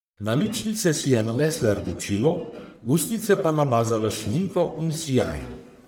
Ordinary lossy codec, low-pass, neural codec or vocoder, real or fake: none; none; codec, 44.1 kHz, 1.7 kbps, Pupu-Codec; fake